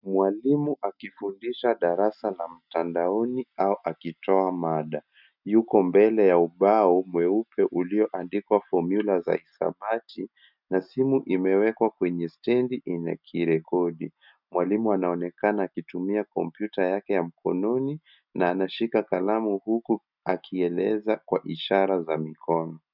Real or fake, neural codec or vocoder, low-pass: real; none; 5.4 kHz